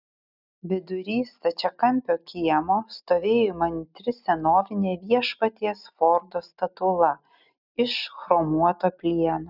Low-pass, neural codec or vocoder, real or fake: 5.4 kHz; none; real